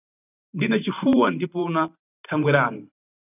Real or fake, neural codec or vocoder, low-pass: fake; vocoder, 44.1 kHz, 128 mel bands every 512 samples, BigVGAN v2; 3.6 kHz